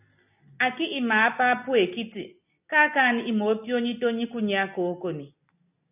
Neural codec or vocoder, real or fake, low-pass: none; real; 3.6 kHz